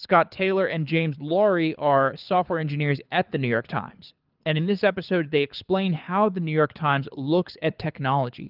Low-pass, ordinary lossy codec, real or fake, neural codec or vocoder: 5.4 kHz; Opus, 24 kbps; fake; codec, 24 kHz, 6 kbps, HILCodec